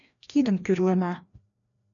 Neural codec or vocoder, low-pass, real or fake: codec, 16 kHz, 1 kbps, FreqCodec, larger model; 7.2 kHz; fake